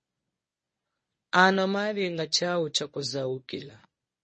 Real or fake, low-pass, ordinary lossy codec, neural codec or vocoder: fake; 10.8 kHz; MP3, 32 kbps; codec, 24 kHz, 0.9 kbps, WavTokenizer, medium speech release version 1